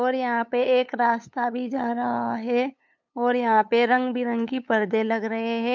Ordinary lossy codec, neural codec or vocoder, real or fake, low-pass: MP3, 64 kbps; codec, 16 kHz, 8 kbps, FunCodec, trained on LibriTTS, 25 frames a second; fake; 7.2 kHz